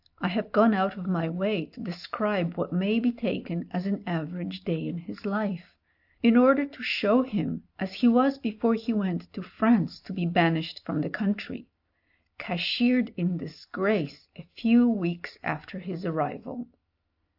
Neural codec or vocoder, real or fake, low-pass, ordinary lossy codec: none; real; 5.4 kHz; AAC, 48 kbps